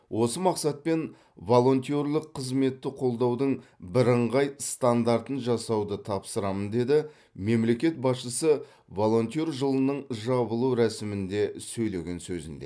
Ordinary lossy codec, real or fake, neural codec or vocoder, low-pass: none; real; none; none